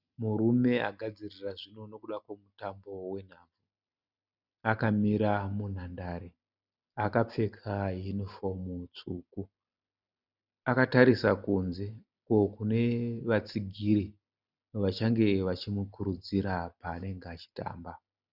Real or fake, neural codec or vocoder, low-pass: real; none; 5.4 kHz